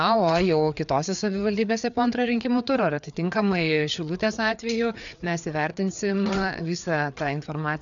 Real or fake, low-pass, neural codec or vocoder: fake; 7.2 kHz; codec, 16 kHz, 4 kbps, FreqCodec, larger model